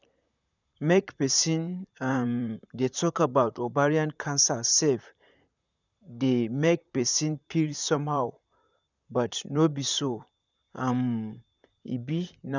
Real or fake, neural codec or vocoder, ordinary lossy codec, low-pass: fake; vocoder, 44.1 kHz, 128 mel bands, Pupu-Vocoder; none; 7.2 kHz